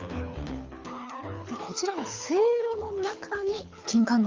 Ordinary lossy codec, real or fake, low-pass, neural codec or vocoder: Opus, 32 kbps; fake; 7.2 kHz; codec, 24 kHz, 6 kbps, HILCodec